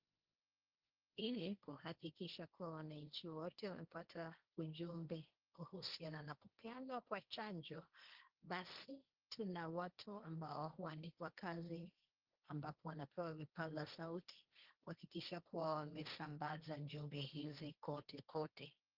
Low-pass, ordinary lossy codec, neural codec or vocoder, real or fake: 5.4 kHz; Opus, 24 kbps; codec, 16 kHz, 1.1 kbps, Voila-Tokenizer; fake